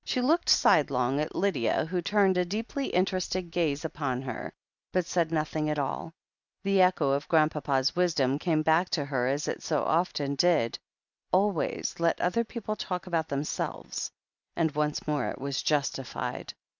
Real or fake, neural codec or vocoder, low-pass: real; none; 7.2 kHz